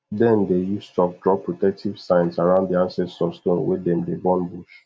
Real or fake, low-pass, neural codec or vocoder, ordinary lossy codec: real; none; none; none